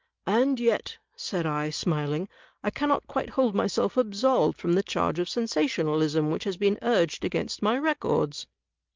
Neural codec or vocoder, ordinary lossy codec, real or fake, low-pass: none; Opus, 24 kbps; real; 7.2 kHz